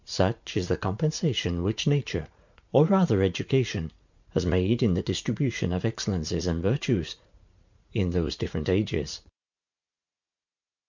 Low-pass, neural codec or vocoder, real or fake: 7.2 kHz; vocoder, 44.1 kHz, 80 mel bands, Vocos; fake